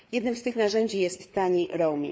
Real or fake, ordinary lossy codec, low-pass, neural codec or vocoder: fake; none; none; codec, 16 kHz, 4 kbps, FreqCodec, larger model